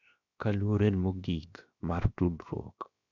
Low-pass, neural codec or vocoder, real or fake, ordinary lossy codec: 7.2 kHz; codec, 16 kHz, 0.7 kbps, FocalCodec; fake; none